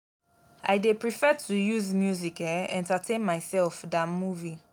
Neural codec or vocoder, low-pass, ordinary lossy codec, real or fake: none; none; none; real